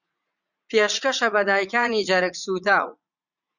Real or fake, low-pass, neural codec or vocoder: fake; 7.2 kHz; vocoder, 44.1 kHz, 80 mel bands, Vocos